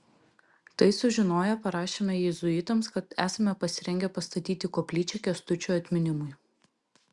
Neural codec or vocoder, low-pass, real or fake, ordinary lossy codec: none; 10.8 kHz; real; Opus, 64 kbps